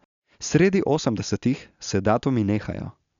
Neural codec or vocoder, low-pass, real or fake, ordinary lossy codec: none; 7.2 kHz; real; MP3, 96 kbps